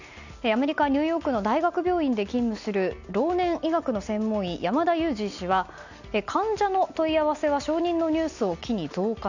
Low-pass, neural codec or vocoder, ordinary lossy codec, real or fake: 7.2 kHz; none; none; real